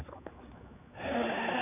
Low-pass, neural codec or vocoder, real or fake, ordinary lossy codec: 3.6 kHz; codec, 16 kHz, 4 kbps, X-Codec, HuBERT features, trained on general audio; fake; none